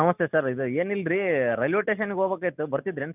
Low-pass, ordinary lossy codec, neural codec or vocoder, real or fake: 3.6 kHz; none; none; real